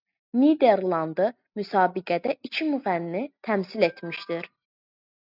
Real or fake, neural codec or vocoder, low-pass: real; none; 5.4 kHz